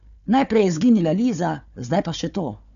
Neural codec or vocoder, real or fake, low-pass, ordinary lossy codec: codec, 16 kHz, 4 kbps, FunCodec, trained on Chinese and English, 50 frames a second; fake; 7.2 kHz; none